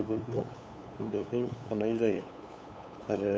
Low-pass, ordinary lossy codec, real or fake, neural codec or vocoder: none; none; fake; codec, 16 kHz, 8 kbps, FunCodec, trained on LibriTTS, 25 frames a second